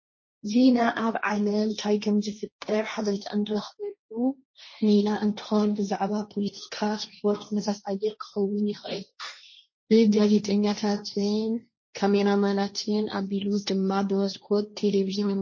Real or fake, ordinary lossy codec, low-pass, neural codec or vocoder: fake; MP3, 32 kbps; 7.2 kHz; codec, 16 kHz, 1.1 kbps, Voila-Tokenizer